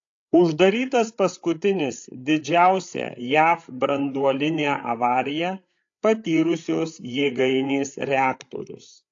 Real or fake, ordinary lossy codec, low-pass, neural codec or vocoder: fake; AAC, 48 kbps; 7.2 kHz; codec, 16 kHz, 8 kbps, FreqCodec, larger model